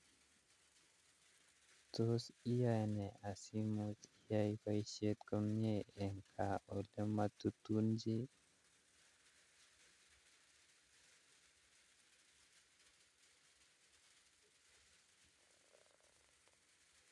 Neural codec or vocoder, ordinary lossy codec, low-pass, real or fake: none; none; none; real